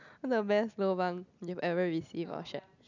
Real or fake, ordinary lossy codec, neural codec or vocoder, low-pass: real; none; none; 7.2 kHz